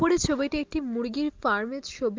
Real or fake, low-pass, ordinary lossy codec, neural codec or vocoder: real; 7.2 kHz; Opus, 24 kbps; none